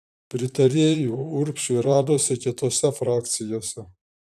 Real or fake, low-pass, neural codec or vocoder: fake; 14.4 kHz; vocoder, 44.1 kHz, 128 mel bands, Pupu-Vocoder